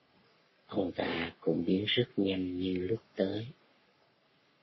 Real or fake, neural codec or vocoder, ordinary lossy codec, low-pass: fake; codec, 44.1 kHz, 3.4 kbps, Pupu-Codec; MP3, 24 kbps; 7.2 kHz